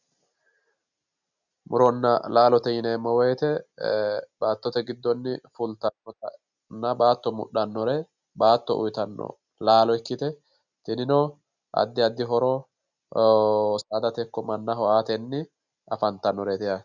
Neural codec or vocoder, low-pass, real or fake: none; 7.2 kHz; real